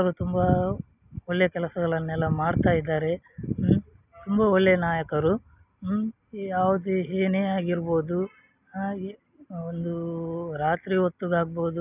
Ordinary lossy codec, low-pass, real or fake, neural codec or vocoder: none; 3.6 kHz; real; none